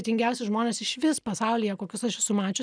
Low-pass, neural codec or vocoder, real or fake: 9.9 kHz; none; real